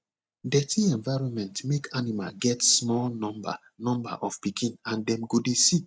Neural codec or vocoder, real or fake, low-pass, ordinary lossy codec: none; real; none; none